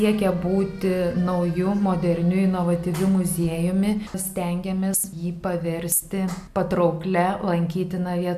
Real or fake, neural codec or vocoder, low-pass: real; none; 14.4 kHz